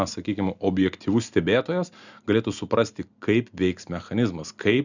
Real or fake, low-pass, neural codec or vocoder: real; 7.2 kHz; none